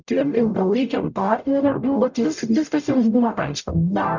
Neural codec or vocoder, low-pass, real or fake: codec, 44.1 kHz, 0.9 kbps, DAC; 7.2 kHz; fake